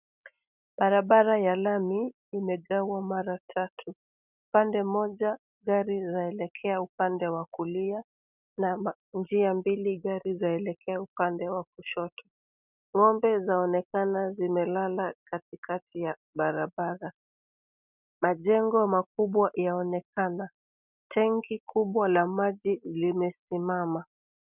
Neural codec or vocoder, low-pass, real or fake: none; 3.6 kHz; real